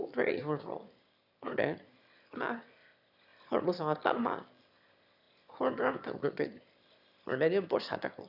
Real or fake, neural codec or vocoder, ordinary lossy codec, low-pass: fake; autoencoder, 22.05 kHz, a latent of 192 numbers a frame, VITS, trained on one speaker; none; 5.4 kHz